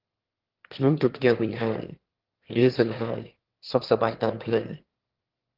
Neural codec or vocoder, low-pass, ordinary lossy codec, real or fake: autoencoder, 22.05 kHz, a latent of 192 numbers a frame, VITS, trained on one speaker; 5.4 kHz; Opus, 16 kbps; fake